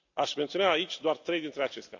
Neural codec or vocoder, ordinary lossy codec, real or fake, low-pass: none; AAC, 48 kbps; real; 7.2 kHz